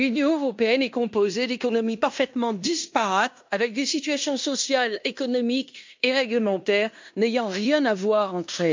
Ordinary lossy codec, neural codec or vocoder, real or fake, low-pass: MP3, 64 kbps; codec, 16 kHz in and 24 kHz out, 0.9 kbps, LongCat-Audio-Codec, fine tuned four codebook decoder; fake; 7.2 kHz